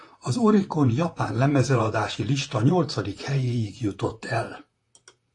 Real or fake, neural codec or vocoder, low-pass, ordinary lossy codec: fake; vocoder, 44.1 kHz, 128 mel bands, Pupu-Vocoder; 10.8 kHz; AAC, 48 kbps